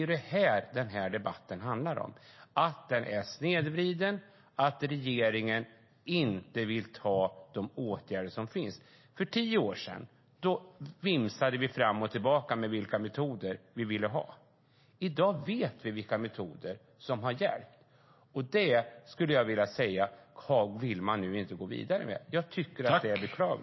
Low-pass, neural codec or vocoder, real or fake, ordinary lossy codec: 7.2 kHz; none; real; MP3, 24 kbps